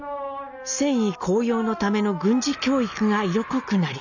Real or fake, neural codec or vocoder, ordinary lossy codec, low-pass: real; none; none; 7.2 kHz